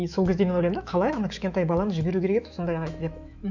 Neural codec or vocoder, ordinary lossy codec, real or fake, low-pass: autoencoder, 48 kHz, 128 numbers a frame, DAC-VAE, trained on Japanese speech; none; fake; 7.2 kHz